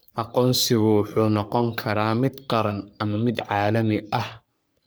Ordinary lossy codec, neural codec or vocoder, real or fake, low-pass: none; codec, 44.1 kHz, 3.4 kbps, Pupu-Codec; fake; none